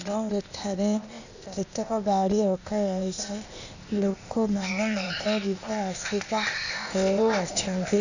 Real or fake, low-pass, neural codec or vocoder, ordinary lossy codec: fake; 7.2 kHz; codec, 16 kHz, 0.8 kbps, ZipCodec; none